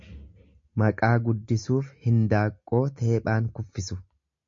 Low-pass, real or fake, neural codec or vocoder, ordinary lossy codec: 7.2 kHz; real; none; MP3, 48 kbps